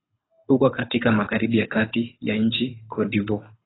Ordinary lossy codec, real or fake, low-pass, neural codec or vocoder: AAC, 16 kbps; fake; 7.2 kHz; codec, 24 kHz, 6 kbps, HILCodec